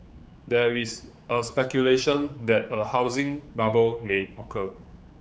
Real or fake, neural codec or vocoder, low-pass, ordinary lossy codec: fake; codec, 16 kHz, 4 kbps, X-Codec, HuBERT features, trained on general audio; none; none